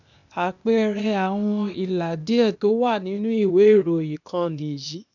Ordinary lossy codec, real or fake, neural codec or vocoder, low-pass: none; fake; codec, 16 kHz, 0.8 kbps, ZipCodec; 7.2 kHz